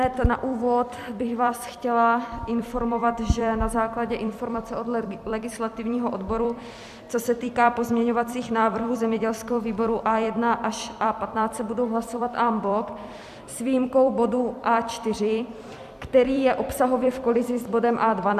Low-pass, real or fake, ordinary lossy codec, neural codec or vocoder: 14.4 kHz; fake; AAC, 96 kbps; vocoder, 44.1 kHz, 128 mel bands every 256 samples, BigVGAN v2